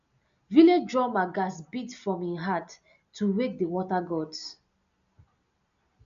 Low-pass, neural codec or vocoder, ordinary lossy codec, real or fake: 7.2 kHz; none; none; real